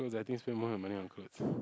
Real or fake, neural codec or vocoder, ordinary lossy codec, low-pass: real; none; none; none